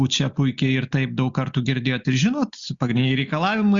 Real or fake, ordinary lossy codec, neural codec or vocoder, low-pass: real; Opus, 64 kbps; none; 7.2 kHz